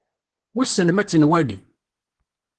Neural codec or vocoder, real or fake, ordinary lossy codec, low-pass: codec, 24 kHz, 1 kbps, SNAC; fake; Opus, 16 kbps; 10.8 kHz